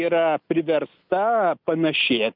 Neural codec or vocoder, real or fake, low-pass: none; real; 5.4 kHz